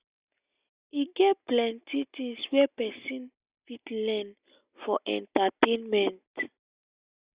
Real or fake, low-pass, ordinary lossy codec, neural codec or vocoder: real; 3.6 kHz; Opus, 64 kbps; none